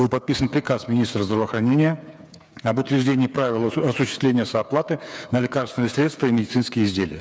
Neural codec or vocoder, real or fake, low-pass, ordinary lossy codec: codec, 16 kHz, 8 kbps, FreqCodec, smaller model; fake; none; none